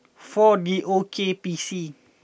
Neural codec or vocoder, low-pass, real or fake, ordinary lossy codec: none; none; real; none